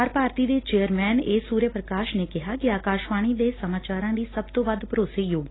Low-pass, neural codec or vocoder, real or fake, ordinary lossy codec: 7.2 kHz; none; real; AAC, 16 kbps